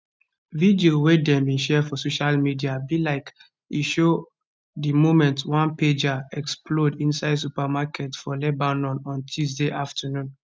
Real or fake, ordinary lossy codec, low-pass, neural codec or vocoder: real; none; none; none